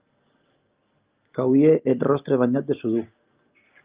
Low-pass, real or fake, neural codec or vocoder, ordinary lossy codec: 3.6 kHz; real; none; Opus, 24 kbps